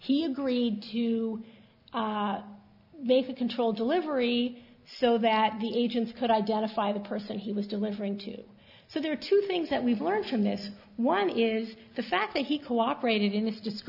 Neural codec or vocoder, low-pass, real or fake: none; 5.4 kHz; real